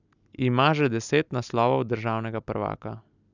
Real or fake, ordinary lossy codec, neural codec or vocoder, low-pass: real; none; none; 7.2 kHz